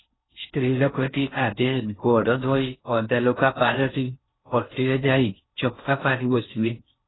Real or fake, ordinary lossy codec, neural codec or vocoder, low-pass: fake; AAC, 16 kbps; codec, 16 kHz in and 24 kHz out, 0.6 kbps, FocalCodec, streaming, 4096 codes; 7.2 kHz